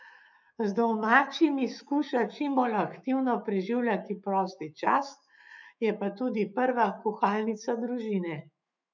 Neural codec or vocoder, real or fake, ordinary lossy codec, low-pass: autoencoder, 48 kHz, 128 numbers a frame, DAC-VAE, trained on Japanese speech; fake; none; 7.2 kHz